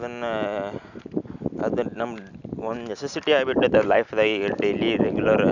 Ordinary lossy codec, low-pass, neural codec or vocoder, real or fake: none; 7.2 kHz; none; real